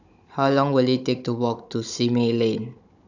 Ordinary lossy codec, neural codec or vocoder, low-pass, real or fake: none; codec, 16 kHz, 16 kbps, FunCodec, trained on Chinese and English, 50 frames a second; 7.2 kHz; fake